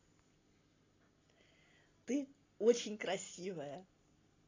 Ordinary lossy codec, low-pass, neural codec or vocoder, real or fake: AAC, 32 kbps; 7.2 kHz; none; real